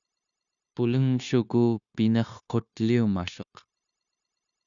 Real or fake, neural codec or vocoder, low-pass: fake; codec, 16 kHz, 0.9 kbps, LongCat-Audio-Codec; 7.2 kHz